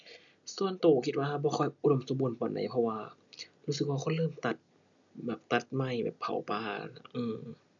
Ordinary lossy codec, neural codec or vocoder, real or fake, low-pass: none; none; real; 7.2 kHz